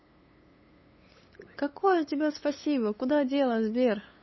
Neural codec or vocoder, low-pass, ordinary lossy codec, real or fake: codec, 16 kHz, 8 kbps, FunCodec, trained on LibriTTS, 25 frames a second; 7.2 kHz; MP3, 24 kbps; fake